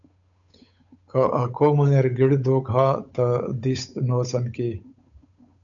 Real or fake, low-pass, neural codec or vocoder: fake; 7.2 kHz; codec, 16 kHz, 8 kbps, FunCodec, trained on Chinese and English, 25 frames a second